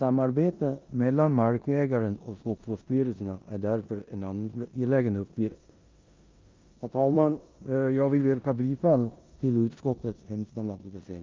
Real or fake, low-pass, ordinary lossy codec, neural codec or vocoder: fake; 7.2 kHz; Opus, 24 kbps; codec, 16 kHz in and 24 kHz out, 0.9 kbps, LongCat-Audio-Codec, four codebook decoder